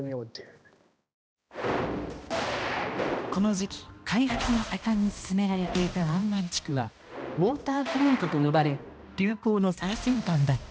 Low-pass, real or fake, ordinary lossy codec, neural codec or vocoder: none; fake; none; codec, 16 kHz, 1 kbps, X-Codec, HuBERT features, trained on balanced general audio